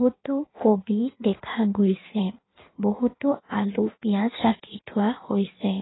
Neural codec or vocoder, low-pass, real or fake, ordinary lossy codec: codec, 16 kHz in and 24 kHz out, 1.1 kbps, FireRedTTS-2 codec; 7.2 kHz; fake; AAC, 16 kbps